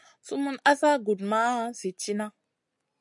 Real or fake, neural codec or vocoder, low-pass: real; none; 10.8 kHz